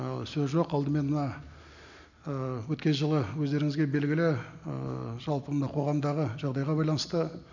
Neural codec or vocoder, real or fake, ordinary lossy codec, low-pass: none; real; none; 7.2 kHz